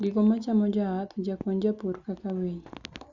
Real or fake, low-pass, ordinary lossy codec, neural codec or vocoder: real; 7.2 kHz; Opus, 64 kbps; none